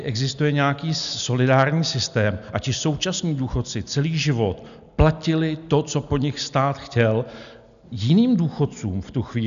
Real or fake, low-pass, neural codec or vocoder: real; 7.2 kHz; none